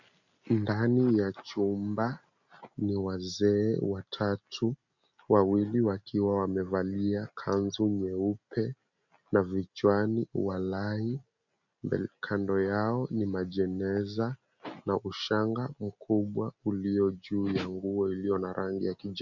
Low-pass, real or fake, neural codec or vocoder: 7.2 kHz; real; none